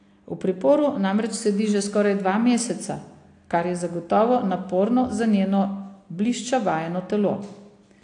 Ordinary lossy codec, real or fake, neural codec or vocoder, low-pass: AAC, 48 kbps; real; none; 9.9 kHz